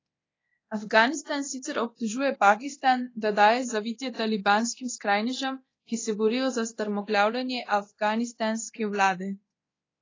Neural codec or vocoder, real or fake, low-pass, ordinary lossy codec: codec, 24 kHz, 0.9 kbps, DualCodec; fake; 7.2 kHz; AAC, 32 kbps